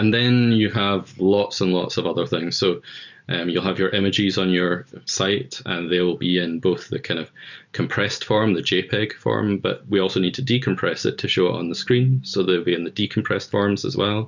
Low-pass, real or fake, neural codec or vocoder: 7.2 kHz; real; none